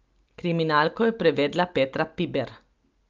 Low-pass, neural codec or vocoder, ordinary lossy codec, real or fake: 7.2 kHz; none; Opus, 32 kbps; real